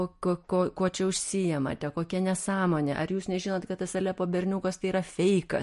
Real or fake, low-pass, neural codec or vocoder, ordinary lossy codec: real; 14.4 kHz; none; MP3, 48 kbps